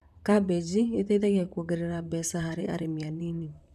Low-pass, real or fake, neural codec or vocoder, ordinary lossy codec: 14.4 kHz; fake; vocoder, 44.1 kHz, 128 mel bands, Pupu-Vocoder; none